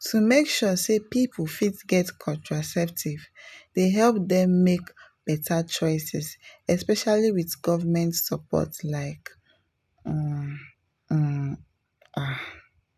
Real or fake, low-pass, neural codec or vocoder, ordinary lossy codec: real; 14.4 kHz; none; none